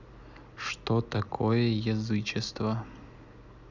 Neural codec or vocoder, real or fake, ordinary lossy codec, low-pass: none; real; none; 7.2 kHz